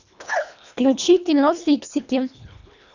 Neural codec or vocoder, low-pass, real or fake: codec, 24 kHz, 1.5 kbps, HILCodec; 7.2 kHz; fake